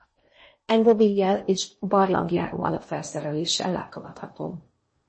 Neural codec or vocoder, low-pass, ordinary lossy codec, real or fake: codec, 16 kHz in and 24 kHz out, 0.8 kbps, FocalCodec, streaming, 65536 codes; 9.9 kHz; MP3, 32 kbps; fake